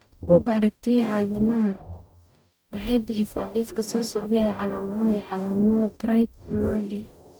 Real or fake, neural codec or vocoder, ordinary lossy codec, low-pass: fake; codec, 44.1 kHz, 0.9 kbps, DAC; none; none